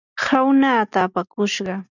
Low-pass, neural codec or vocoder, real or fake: 7.2 kHz; none; real